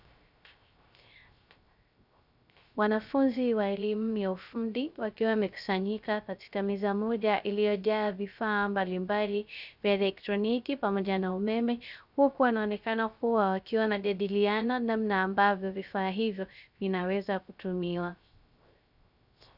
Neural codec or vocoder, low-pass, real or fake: codec, 16 kHz, 0.3 kbps, FocalCodec; 5.4 kHz; fake